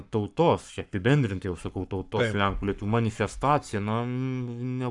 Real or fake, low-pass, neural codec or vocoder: fake; 10.8 kHz; codec, 44.1 kHz, 7.8 kbps, Pupu-Codec